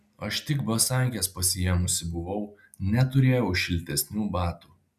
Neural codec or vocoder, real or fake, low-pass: none; real; 14.4 kHz